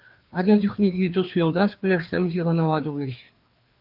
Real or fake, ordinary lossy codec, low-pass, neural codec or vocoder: fake; Opus, 32 kbps; 5.4 kHz; codec, 16 kHz, 2 kbps, FreqCodec, larger model